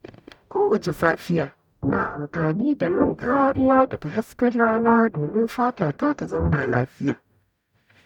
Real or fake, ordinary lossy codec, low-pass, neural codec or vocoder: fake; none; 19.8 kHz; codec, 44.1 kHz, 0.9 kbps, DAC